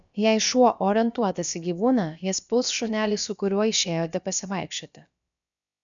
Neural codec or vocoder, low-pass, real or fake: codec, 16 kHz, about 1 kbps, DyCAST, with the encoder's durations; 7.2 kHz; fake